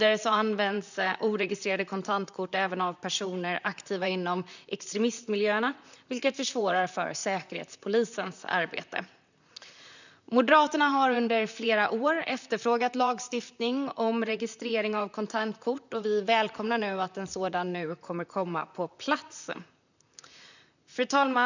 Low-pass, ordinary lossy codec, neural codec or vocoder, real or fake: 7.2 kHz; none; vocoder, 44.1 kHz, 128 mel bands, Pupu-Vocoder; fake